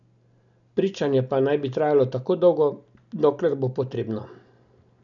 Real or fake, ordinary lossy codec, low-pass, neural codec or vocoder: real; none; 7.2 kHz; none